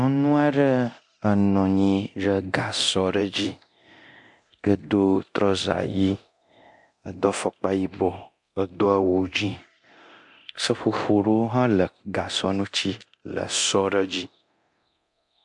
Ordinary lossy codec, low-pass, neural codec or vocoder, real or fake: MP3, 64 kbps; 10.8 kHz; codec, 24 kHz, 0.9 kbps, DualCodec; fake